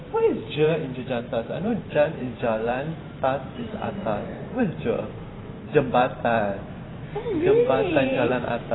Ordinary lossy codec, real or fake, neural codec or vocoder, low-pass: AAC, 16 kbps; fake; vocoder, 44.1 kHz, 128 mel bands every 512 samples, BigVGAN v2; 7.2 kHz